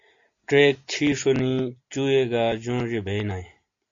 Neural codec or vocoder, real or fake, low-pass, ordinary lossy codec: none; real; 7.2 kHz; AAC, 48 kbps